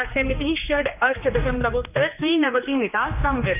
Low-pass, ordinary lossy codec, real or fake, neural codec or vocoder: 3.6 kHz; none; fake; codec, 16 kHz, 2 kbps, X-Codec, HuBERT features, trained on general audio